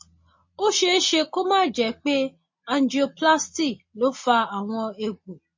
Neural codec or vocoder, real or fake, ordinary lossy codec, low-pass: none; real; MP3, 32 kbps; 7.2 kHz